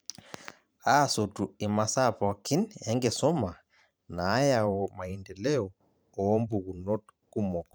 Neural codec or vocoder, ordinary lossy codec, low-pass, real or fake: vocoder, 44.1 kHz, 128 mel bands every 512 samples, BigVGAN v2; none; none; fake